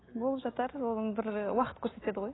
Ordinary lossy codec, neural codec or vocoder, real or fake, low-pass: AAC, 16 kbps; none; real; 7.2 kHz